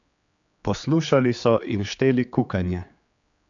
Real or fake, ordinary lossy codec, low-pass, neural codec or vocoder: fake; AAC, 64 kbps; 7.2 kHz; codec, 16 kHz, 4 kbps, X-Codec, HuBERT features, trained on general audio